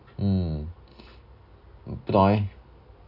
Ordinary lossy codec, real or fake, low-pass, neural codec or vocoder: none; real; 5.4 kHz; none